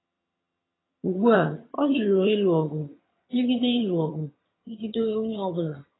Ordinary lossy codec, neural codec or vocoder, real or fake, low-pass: AAC, 16 kbps; vocoder, 22.05 kHz, 80 mel bands, HiFi-GAN; fake; 7.2 kHz